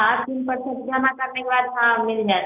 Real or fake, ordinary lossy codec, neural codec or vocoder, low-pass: real; none; none; 3.6 kHz